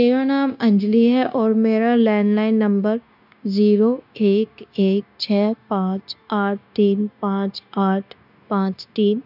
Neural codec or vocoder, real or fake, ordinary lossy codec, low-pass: codec, 16 kHz, 0.9 kbps, LongCat-Audio-Codec; fake; none; 5.4 kHz